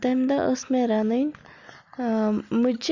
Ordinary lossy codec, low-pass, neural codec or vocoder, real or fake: Opus, 64 kbps; 7.2 kHz; none; real